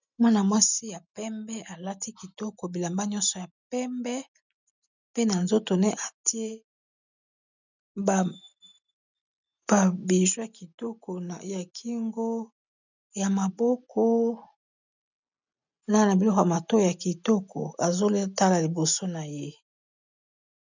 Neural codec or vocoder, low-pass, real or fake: none; 7.2 kHz; real